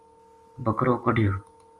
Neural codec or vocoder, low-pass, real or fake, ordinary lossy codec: none; 10.8 kHz; real; Opus, 32 kbps